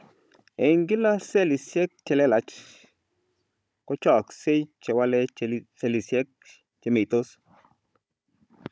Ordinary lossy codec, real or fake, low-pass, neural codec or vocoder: none; fake; none; codec, 16 kHz, 16 kbps, FunCodec, trained on Chinese and English, 50 frames a second